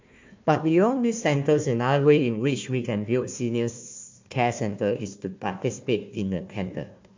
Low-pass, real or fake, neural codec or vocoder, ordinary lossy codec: 7.2 kHz; fake; codec, 16 kHz, 1 kbps, FunCodec, trained on Chinese and English, 50 frames a second; MP3, 48 kbps